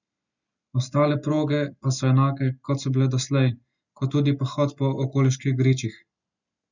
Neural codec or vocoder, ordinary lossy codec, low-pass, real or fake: none; none; 7.2 kHz; real